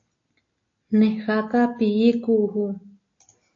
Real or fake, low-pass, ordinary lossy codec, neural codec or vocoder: real; 7.2 kHz; AAC, 48 kbps; none